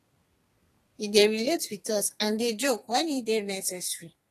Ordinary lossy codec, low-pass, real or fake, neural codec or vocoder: AAC, 48 kbps; 14.4 kHz; fake; codec, 32 kHz, 1.9 kbps, SNAC